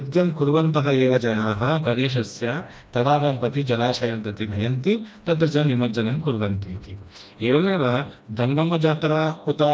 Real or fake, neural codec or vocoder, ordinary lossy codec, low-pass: fake; codec, 16 kHz, 1 kbps, FreqCodec, smaller model; none; none